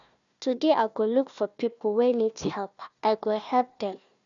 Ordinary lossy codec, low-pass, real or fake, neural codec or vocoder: none; 7.2 kHz; fake; codec, 16 kHz, 1 kbps, FunCodec, trained on Chinese and English, 50 frames a second